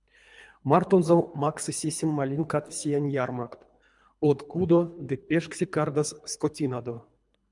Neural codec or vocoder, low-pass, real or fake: codec, 24 kHz, 3 kbps, HILCodec; 10.8 kHz; fake